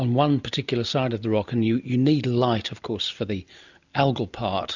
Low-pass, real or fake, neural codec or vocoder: 7.2 kHz; real; none